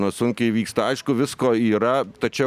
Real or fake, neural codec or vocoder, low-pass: real; none; 14.4 kHz